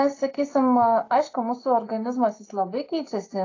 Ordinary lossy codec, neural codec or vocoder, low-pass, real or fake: AAC, 32 kbps; none; 7.2 kHz; real